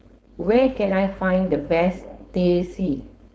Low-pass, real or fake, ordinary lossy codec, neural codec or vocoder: none; fake; none; codec, 16 kHz, 4.8 kbps, FACodec